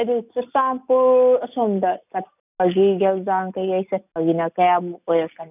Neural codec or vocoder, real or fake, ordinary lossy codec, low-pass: none; real; none; 3.6 kHz